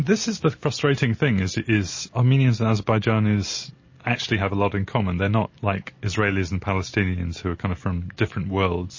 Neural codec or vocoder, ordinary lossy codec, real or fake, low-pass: none; MP3, 32 kbps; real; 7.2 kHz